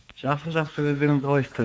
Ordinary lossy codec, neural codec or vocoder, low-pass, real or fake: none; codec, 16 kHz, 4 kbps, X-Codec, WavLM features, trained on Multilingual LibriSpeech; none; fake